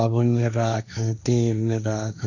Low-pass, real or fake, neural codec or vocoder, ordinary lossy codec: 7.2 kHz; fake; codec, 16 kHz, 1.1 kbps, Voila-Tokenizer; none